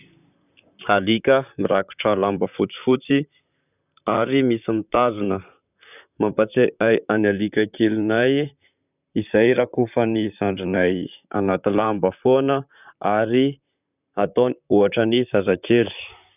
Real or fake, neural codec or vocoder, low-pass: fake; vocoder, 44.1 kHz, 128 mel bands, Pupu-Vocoder; 3.6 kHz